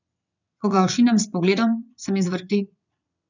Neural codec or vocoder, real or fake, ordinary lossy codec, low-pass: vocoder, 22.05 kHz, 80 mel bands, WaveNeXt; fake; none; 7.2 kHz